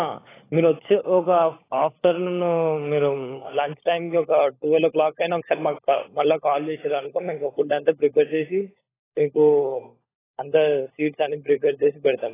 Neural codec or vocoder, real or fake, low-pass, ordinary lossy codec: codec, 16 kHz, 16 kbps, FunCodec, trained on LibriTTS, 50 frames a second; fake; 3.6 kHz; AAC, 16 kbps